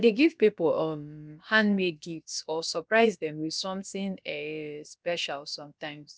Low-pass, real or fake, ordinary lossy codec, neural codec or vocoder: none; fake; none; codec, 16 kHz, about 1 kbps, DyCAST, with the encoder's durations